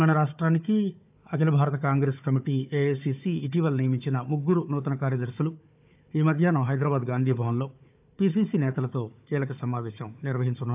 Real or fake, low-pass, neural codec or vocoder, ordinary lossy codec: fake; 3.6 kHz; codec, 16 kHz, 4 kbps, FunCodec, trained on Chinese and English, 50 frames a second; AAC, 32 kbps